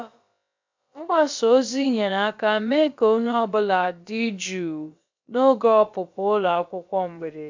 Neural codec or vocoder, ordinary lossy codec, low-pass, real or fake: codec, 16 kHz, about 1 kbps, DyCAST, with the encoder's durations; MP3, 64 kbps; 7.2 kHz; fake